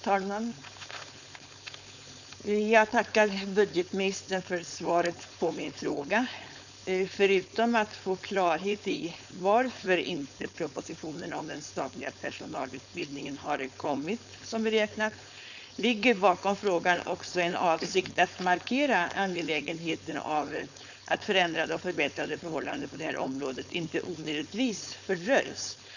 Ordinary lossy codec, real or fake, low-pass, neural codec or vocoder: none; fake; 7.2 kHz; codec, 16 kHz, 4.8 kbps, FACodec